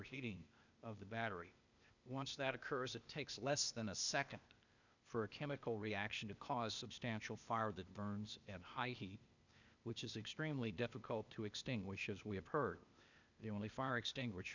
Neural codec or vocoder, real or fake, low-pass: codec, 16 kHz, 0.8 kbps, ZipCodec; fake; 7.2 kHz